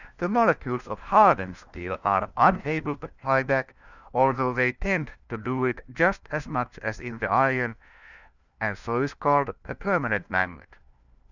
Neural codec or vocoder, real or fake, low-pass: codec, 16 kHz, 1 kbps, FunCodec, trained on LibriTTS, 50 frames a second; fake; 7.2 kHz